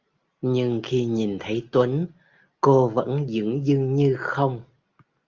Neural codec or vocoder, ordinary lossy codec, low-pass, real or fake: none; Opus, 24 kbps; 7.2 kHz; real